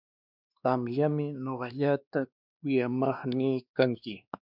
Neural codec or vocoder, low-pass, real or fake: codec, 16 kHz, 2 kbps, X-Codec, WavLM features, trained on Multilingual LibriSpeech; 5.4 kHz; fake